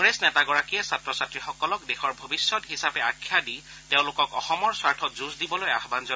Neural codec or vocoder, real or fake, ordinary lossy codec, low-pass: none; real; none; none